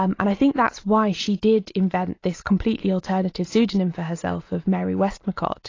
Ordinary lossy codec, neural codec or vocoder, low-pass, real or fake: AAC, 32 kbps; none; 7.2 kHz; real